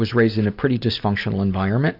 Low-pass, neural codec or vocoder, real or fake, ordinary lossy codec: 5.4 kHz; none; real; AAC, 48 kbps